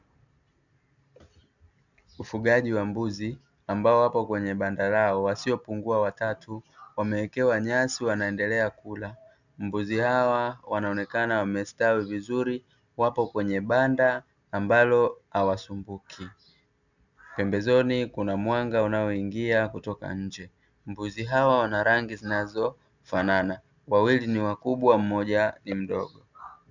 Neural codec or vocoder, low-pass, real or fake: none; 7.2 kHz; real